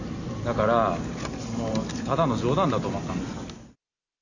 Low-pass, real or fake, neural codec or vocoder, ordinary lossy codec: 7.2 kHz; real; none; none